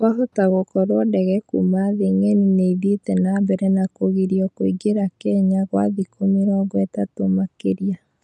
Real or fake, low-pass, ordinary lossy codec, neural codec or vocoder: real; none; none; none